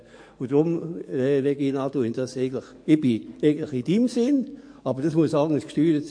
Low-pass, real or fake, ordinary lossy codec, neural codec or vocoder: 9.9 kHz; fake; MP3, 48 kbps; codec, 44.1 kHz, 7.8 kbps, DAC